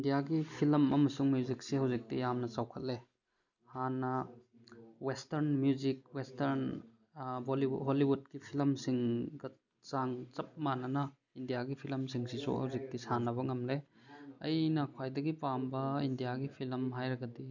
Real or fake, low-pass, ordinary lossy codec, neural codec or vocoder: real; 7.2 kHz; none; none